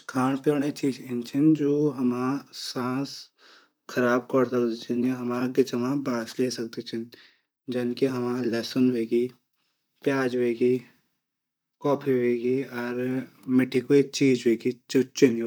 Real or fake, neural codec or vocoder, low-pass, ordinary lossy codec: fake; vocoder, 44.1 kHz, 128 mel bands, Pupu-Vocoder; none; none